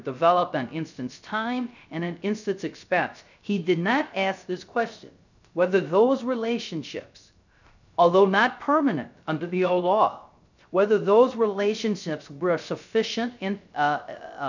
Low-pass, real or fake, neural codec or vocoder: 7.2 kHz; fake; codec, 16 kHz, 0.3 kbps, FocalCodec